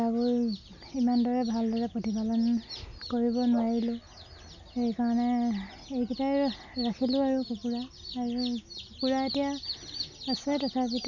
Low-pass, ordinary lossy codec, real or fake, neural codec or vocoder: 7.2 kHz; none; real; none